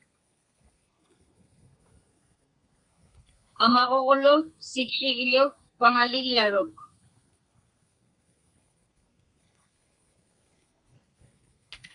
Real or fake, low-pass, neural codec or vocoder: fake; 10.8 kHz; codec, 32 kHz, 1.9 kbps, SNAC